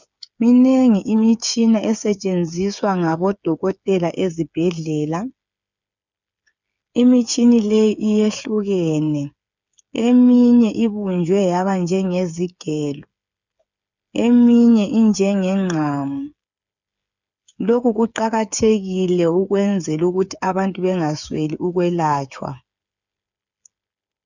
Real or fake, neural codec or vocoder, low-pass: fake; codec, 16 kHz, 8 kbps, FreqCodec, smaller model; 7.2 kHz